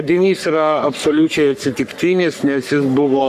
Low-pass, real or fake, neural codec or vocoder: 14.4 kHz; fake; codec, 44.1 kHz, 3.4 kbps, Pupu-Codec